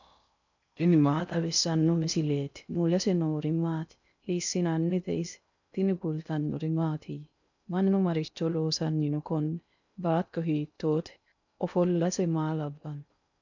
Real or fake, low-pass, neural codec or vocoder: fake; 7.2 kHz; codec, 16 kHz in and 24 kHz out, 0.6 kbps, FocalCodec, streaming, 4096 codes